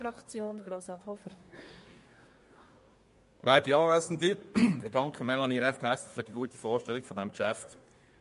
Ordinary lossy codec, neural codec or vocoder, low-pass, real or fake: MP3, 48 kbps; codec, 24 kHz, 1 kbps, SNAC; 10.8 kHz; fake